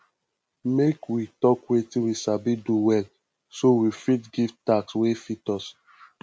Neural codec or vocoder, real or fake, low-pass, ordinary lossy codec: none; real; none; none